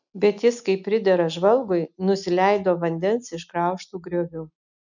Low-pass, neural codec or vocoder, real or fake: 7.2 kHz; none; real